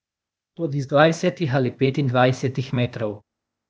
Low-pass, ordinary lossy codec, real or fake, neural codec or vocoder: none; none; fake; codec, 16 kHz, 0.8 kbps, ZipCodec